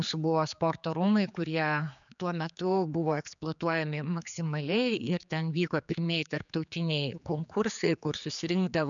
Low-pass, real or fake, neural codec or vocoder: 7.2 kHz; fake; codec, 16 kHz, 4 kbps, X-Codec, HuBERT features, trained on general audio